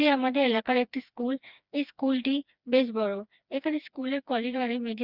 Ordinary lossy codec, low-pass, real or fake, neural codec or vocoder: Opus, 64 kbps; 5.4 kHz; fake; codec, 16 kHz, 2 kbps, FreqCodec, smaller model